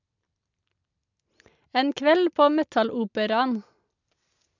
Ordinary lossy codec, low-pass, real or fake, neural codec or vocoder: none; 7.2 kHz; real; none